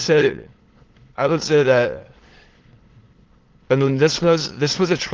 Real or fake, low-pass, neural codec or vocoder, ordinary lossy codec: fake; 7.2 kHz; autoencoder, 22.05 kHz, a latent of 192 numbers a frame, VITS, trained on many speakers; Opus, 16 kbps